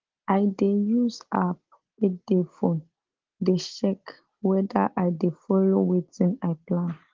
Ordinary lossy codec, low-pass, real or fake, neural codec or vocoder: Opus, 16 kbps; 7.2 kHz; real; none